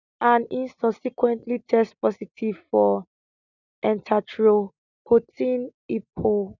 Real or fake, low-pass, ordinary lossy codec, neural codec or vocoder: real; 7.2 kHz; none; none